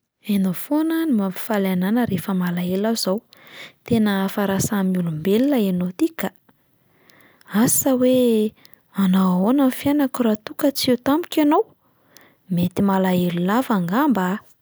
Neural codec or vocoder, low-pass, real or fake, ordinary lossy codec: none; none; real; none